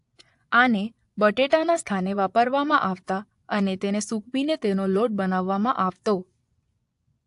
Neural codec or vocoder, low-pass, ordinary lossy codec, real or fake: vocoder, 24 kHz, 100 mel bands, Vocos; 10.8 kHz; AAC, 64 kbps; fake